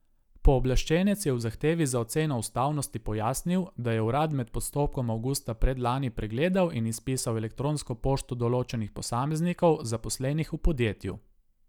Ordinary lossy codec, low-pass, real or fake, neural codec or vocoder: none; 19.8 kHz; real; none